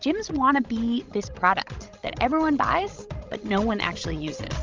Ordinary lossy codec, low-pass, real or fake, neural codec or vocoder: Opus, 32 kbps; 7.2 kHz; fake; codec, 16 kHz, 16 kbps, FreqCodec, larger model